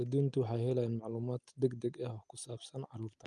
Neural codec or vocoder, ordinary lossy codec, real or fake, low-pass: none; Opus, 32 kbps; real; 9.9 kHz